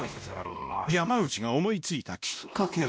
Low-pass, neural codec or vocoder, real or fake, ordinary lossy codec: none; codec, 16 kHz, 1 kbps, X-Codec, WavLM features, trained on Multilingual LibriSpeech; fake; none